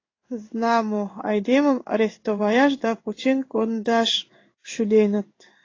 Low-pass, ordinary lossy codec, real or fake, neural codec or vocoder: 7.2 kHz; AAC, 32 kbps; fake; codec, 16 kHz in and 24 kHz out, 1 kbps, XY-Tokenizer